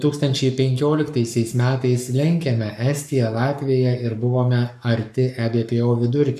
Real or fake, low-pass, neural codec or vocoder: fake; 14.4 kHz; codec, 44.1 kHz, 7.8 kbps, Pupu-Codec